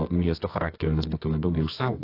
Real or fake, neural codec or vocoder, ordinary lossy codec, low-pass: fake; codec, 24 kHz, 0.9 kbps, WavTokenizer, medium music audio release; AAC, 32 kbps; 5.4 kHz